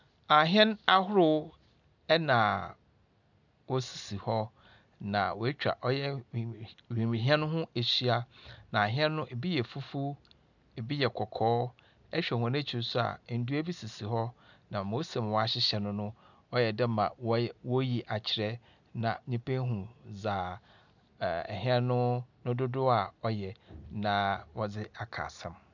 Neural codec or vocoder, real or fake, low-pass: none; real; 7.2 kHz